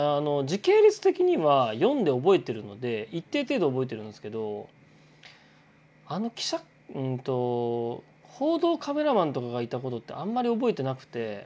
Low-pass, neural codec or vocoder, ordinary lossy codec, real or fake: none; none; none; real